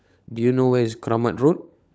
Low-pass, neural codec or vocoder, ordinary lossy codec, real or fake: none; codec, 16 kHz, 16 kbps, FunCodec, trained on LibriTTS, 50 frames a second; none; fake